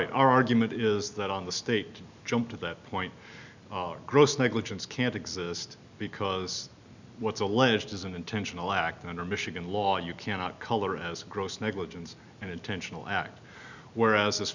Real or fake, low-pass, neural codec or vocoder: real; 7.2 kHz; none